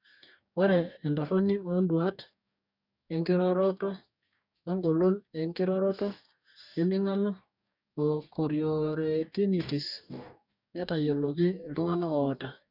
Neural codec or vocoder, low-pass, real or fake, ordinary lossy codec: codec, 44.1 kHz, 2.6 kbps, DAC; 5.4 kHz; fake; none